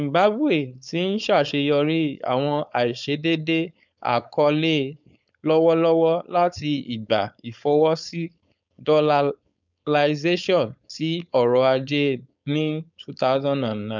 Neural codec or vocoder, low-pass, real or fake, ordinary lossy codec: codec, 16 kHz, 4.8 kbps, FACodec; 7.2 kHz; fake; none